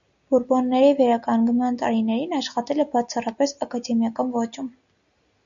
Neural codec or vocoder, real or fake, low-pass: none; real; 7.2 kHz